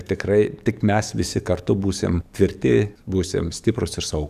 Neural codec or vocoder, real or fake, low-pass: codec, 44.1 kHz, 7.8 kbps, DAC; fake; 14.4 kHz